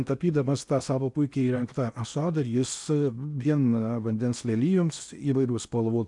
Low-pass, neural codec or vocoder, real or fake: 10.8 kHz; codec, 16 kHz in and 24 kHz out, 0.8 kbps, FocalCodec, streaming, 65536 codes; fake